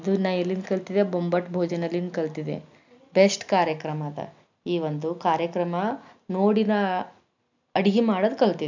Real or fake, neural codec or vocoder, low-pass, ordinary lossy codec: real; none; 7.2 kHz; none